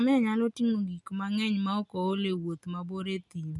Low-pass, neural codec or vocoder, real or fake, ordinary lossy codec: 10.8 kHz; none; real; none